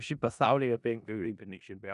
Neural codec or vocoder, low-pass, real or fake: codec, 16 kHz in and 24 kHz out, 0.4 kbps, LongCat-Audio-Codec, four codebook decoder; 10.8 kHz; fake